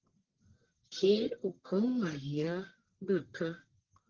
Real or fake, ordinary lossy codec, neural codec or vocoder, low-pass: fake; Opus, 16 kbps; codec, 44.1 kHz, 1.7 kbps, Pupu-Codec; 7.2 kHz